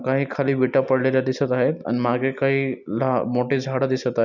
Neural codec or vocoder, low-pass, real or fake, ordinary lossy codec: none; 7.2 kHz; real; none